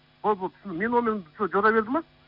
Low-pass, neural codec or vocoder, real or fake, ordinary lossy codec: 5.4 kHz; none; real; Opus, 64 kbps